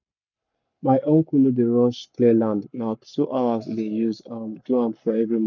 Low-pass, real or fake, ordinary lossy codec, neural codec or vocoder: 7.2 kHz; fake; none; codec, 44.1 kHz, 7.8 kbps, Pupu-Codec